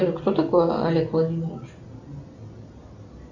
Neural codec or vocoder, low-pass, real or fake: none; 7.2 kHz; real